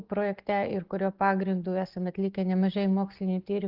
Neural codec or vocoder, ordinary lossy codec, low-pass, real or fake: none; Opus, 16 kbps; 5.4 kHz; real